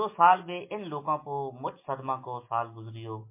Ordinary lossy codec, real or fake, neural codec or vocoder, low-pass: MP3, 24 kbps; real; none; 3.6 kHz